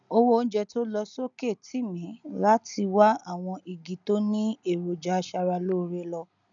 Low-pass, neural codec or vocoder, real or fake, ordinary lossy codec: 7.2 kHz; none; real; none